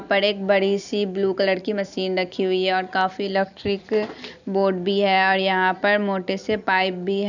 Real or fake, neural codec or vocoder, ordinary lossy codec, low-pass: fake; vocoder, 44.1 kHz, 128 mel bands every 256 samples, BigVGAN v2; none; 7.2 kHz